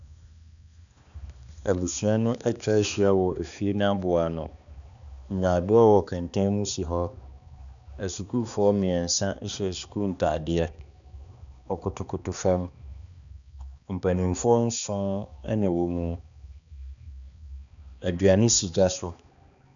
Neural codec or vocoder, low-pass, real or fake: codec, 16 kHz, 2 kbps, X-Codec, HuBERT features, trained on balanced general audio; 7.2 kHz; fake